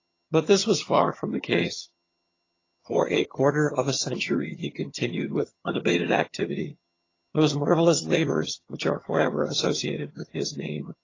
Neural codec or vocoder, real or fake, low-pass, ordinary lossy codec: vocoder, 22.05 kHz, 80 mel bands, HiFi-GAN; fake; 7.2 kHz; AAC, 32 kbps